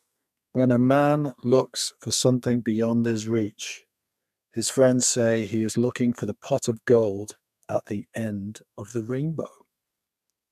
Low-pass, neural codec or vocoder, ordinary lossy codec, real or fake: 14.4 kHz; codec, 32 kHz, 1.9 kbps, SNAC; none; fake